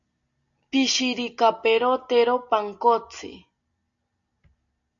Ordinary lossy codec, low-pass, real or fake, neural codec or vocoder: AAC, 64 kbps; 7.2 kHz; real; none